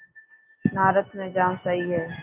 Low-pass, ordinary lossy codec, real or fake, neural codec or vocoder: 3.6 kHz; Opus, 32 kbps; real; none